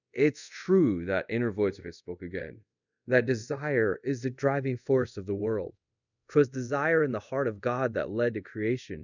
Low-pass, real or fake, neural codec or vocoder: 7.2 kHz; fake; codec, 24 kHz, 0.5 kbps, DualCodec